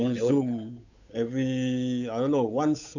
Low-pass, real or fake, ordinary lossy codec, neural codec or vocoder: 7.2 kHz; fake; none; codec, 16 kHz, 8 kbps, FunCodec, trained on Chinese and English, 25 frames a second